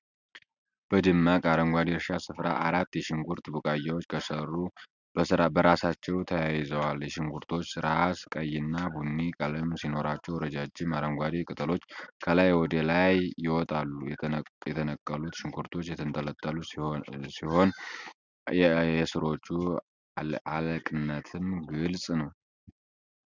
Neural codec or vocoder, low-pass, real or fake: none; 7.2 kHz; real